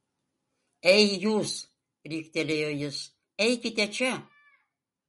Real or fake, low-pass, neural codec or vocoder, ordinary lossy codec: real; 19.8 kHz; none; MP3, 48 kbps